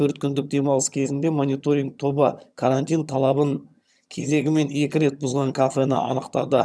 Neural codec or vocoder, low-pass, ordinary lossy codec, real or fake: vocoder, 22.05 kHz, 80 mel bands, HiFi-GAN; none; none; fake